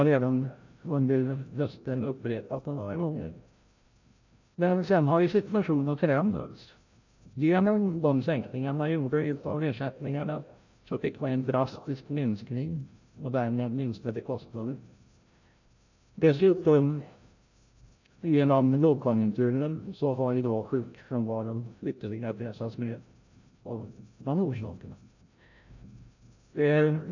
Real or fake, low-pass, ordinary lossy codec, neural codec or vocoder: fake; 7.2 kHz; AAC, 48 kbps; codec, 16 kHz, 0.5 kbps, FreqCodec, larger model